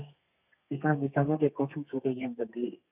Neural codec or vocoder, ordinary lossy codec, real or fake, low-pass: codec, 32 kHz, 1.9 kbps, SNAC; none; fake; 3.6 kHz